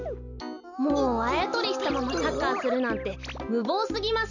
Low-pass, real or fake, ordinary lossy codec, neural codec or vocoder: 7.2 kHz; real; none; none